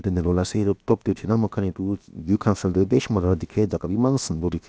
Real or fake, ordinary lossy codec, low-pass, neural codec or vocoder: fake; none; none; codec, 16 kHz, about 1 kbps, DyCAST, with the encoder's durations